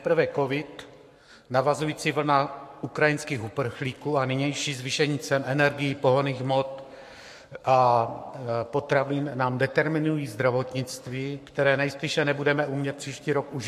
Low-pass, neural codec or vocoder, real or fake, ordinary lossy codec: 14.4 kHz; codec, 44.1 kHz, 7.8 kbps, Pupu-Codec; fake; MP3, 64 kbps